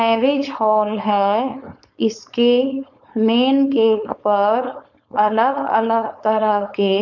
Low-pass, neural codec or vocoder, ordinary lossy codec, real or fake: 7.2 kHz; codec, 16 kHz, 4.8 kbps, FACodec; none; fake